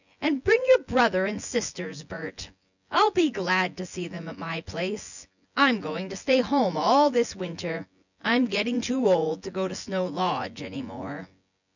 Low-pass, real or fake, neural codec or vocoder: 7.2 kHz; fake; vocoder, 24 kHz, 100 mel bands, Vocos